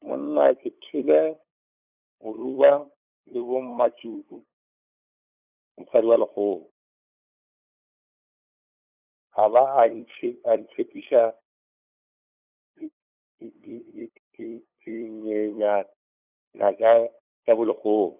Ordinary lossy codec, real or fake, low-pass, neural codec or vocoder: none; fake; 3.6 kHz; codec, 16 kHz, 8 kbps, FunCodec, trained on LibriTTS, 25 frames a second